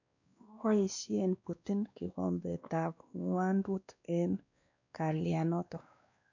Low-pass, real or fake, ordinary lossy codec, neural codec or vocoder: 7.2 kHz; fake; none; codec, 16 kHz, 2 kbps, X-Codec, WavLM features, trained on Multilingual LibriSpeech